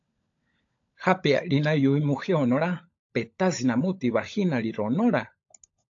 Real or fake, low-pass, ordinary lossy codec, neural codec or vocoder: fake; 7.2 kHz; AAC, 48 kbps; codec, 16 kHz, 16 kbps, FunCodec, trained on LibriTTS, 50 frames a second